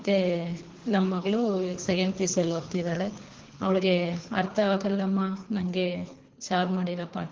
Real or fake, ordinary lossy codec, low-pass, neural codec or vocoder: fake; Opus, 16 kbps; 7.2 kHz; codec, 24 kHz, 3 kbps, HILCodec